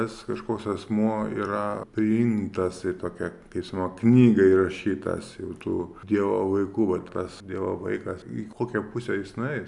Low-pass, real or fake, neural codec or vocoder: 10.8 kHz; real; none